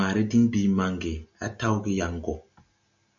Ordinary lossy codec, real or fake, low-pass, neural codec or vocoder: MP3, 96 kbps; real; 7.2 kHz; none